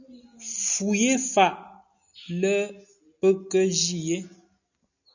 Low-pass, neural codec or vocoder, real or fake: 7.2 kHz; none; real